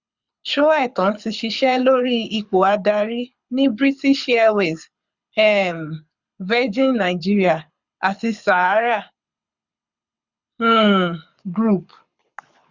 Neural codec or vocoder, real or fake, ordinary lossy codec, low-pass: codec, 24 kHz, 6 kbps, HILCodec; fake; Opus, 64 kbps; 7.2 kHz